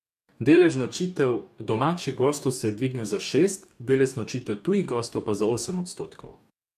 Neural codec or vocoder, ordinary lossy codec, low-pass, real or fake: codec, 44.1 kHz, 2.6 kbps, DAC; none; 14.4 kHz; fake